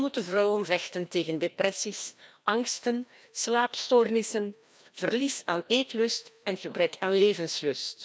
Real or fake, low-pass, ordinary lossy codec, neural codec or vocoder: fake; none; none; codec, 16 kHz, 1 kbps, FreqCodec, larger model